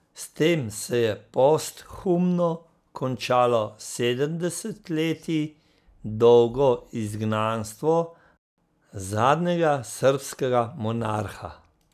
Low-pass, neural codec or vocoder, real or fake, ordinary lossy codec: 14.4 kHz; none; real; none